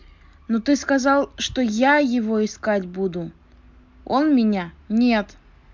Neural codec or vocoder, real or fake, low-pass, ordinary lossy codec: none; real; 7.2 kHz; MP3, 64 kbps